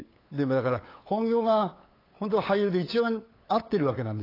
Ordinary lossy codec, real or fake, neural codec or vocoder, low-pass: AAC, 24 kbps; fake; codec, 16 kHz, 8 kbps, FunCodec, trained on LibriTTS, 25 frames a second; 5.4 kHz